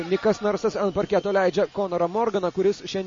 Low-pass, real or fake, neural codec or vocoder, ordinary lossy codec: 7.2 kHz; real; none; MP3, 32 kbps